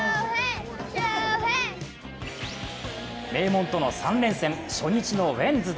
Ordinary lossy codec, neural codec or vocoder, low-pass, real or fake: none; none; none; real